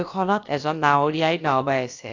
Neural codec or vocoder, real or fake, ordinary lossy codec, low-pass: codec, 16 kHz, about 1 kbps, DyCAST, with the encoder's durations; fake; AAC, 48 kbps; 7.2 kHz